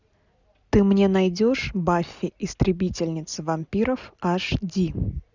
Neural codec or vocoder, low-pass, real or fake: none; 7.2 kHz; real